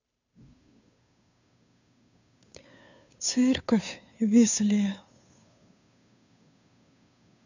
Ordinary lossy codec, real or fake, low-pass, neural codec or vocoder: none; fake; 7.2 kHz; codec, 16 kHz, 2 kbps, FunCodec, trained on Chinese and English, 25 frames a second